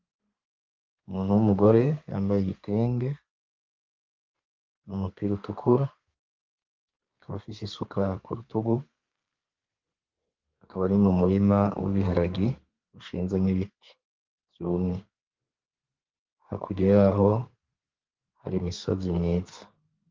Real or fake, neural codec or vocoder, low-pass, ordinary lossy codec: fake; codec, 32 kHz, 1.9 kbps, SNAC; 7.2 kHz; Opus, 32 kbps